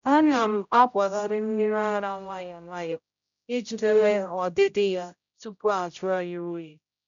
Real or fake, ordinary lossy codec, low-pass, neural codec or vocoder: fake; none; 7.2 kHz; codec, 16 kHz, 0.5 kbps, X-Codec, HuBERT features, trained on general audio